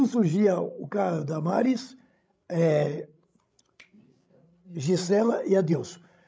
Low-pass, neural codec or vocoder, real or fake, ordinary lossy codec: none; codec, 16 kHz, 16 kbps, FreqCodec, larger model; fake; none